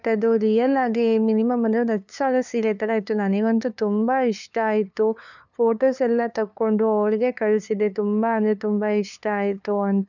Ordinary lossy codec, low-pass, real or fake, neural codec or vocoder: none; 7.2 kHz; fake; codec, 16 kHz, 2 kbps, FunCodec, trained on LibriTTS, 25 frames a second